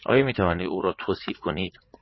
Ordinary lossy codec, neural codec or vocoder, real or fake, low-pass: MP3, 24 kbps; vocoder, 44.1 kHz, 80 mel bands, Vocos; fake; 7.2 kHz